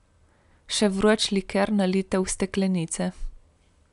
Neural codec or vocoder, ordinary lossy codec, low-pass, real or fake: none; none; 10.8 kHz; real